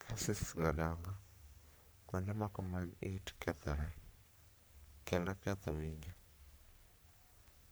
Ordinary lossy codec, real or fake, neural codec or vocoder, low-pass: none; fake; codec, 44.1 kHz, 3.4 kbps, Pupu-Codec; none